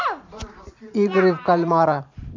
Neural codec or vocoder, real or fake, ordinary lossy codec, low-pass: vocoder, 44.1 kHz, 128 mel bands every 512 samples, BigVGAN v2; fake; MP3, 64 kbps; 7.2 kHz